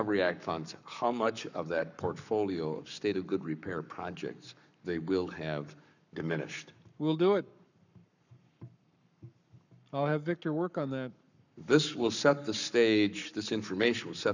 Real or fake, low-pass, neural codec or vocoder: fake; 7.2 kHz; codec, 44.1 kHz, 7.8 kbps, Pupu-Codec